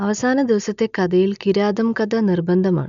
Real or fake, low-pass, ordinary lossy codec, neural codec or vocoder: real; 7.2 kHz; none; none